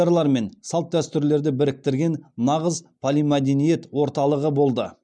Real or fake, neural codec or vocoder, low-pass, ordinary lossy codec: real; none; 9.9 kHz; none